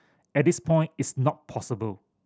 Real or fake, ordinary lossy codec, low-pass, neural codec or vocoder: real; none; none; none